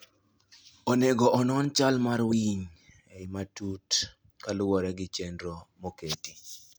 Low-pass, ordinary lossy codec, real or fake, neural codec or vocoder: none; none; real; none